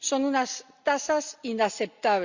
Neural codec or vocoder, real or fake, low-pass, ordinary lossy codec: none; real; 7.2 kHz; Opus, 64 kbps